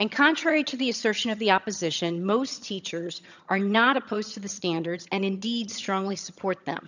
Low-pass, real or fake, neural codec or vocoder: 7.2 kHz; fake; vocoder, 22.05 kHz, 80 mel bands, HiFi-GAN